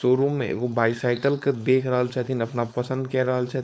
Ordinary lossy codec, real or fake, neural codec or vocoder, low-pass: none; fake; codec, 16 kHz, 4.8 kbps, FACodec; none